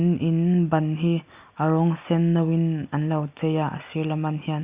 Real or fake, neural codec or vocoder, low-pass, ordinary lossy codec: real; none; 3.6 kHz; Opus, 64 kbps